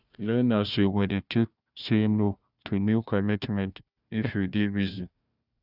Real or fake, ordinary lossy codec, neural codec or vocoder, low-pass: fake; none; codec, 16 kHz, 1 kbps, FunCodec, trained on Chinese and English, 50 frames a second; 5.4 kHz